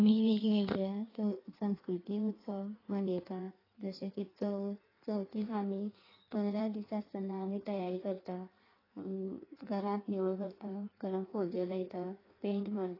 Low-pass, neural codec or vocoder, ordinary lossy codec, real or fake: 5.4 kHz; codec, 16 kHz in and 24 kHz out, 1.1 kbps, FireRedTTS-2 codec; AAC, 24 kbps; fake